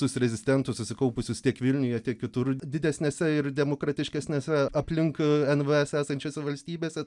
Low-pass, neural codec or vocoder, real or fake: 10.8 kHz; none; real